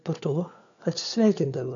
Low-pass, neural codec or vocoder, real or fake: 7.2 kHz; codec, 16 kHz, 4 kbps, FunCodec, trained on LibriTTS, 50 frames a second; fake